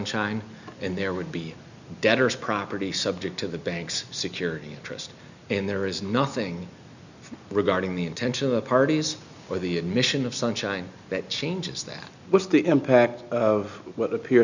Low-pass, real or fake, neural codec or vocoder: 7.2 kHz; real; none